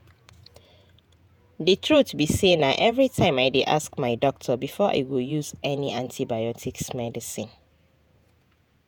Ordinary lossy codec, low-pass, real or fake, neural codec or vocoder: none; none; fake; vocoder, 48 kHz, 128 mel bands, Vocos